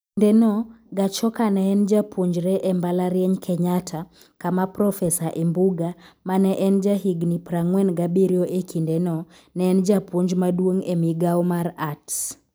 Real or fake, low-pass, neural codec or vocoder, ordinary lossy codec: real; none; none; none